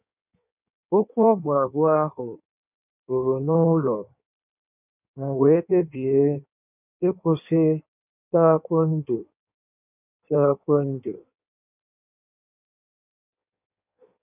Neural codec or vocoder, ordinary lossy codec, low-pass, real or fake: codec, 16 kHz in and 24 kHz out, 1.1 kbps, FireRedTTS-2 codec; none; 3.6 kHz; fake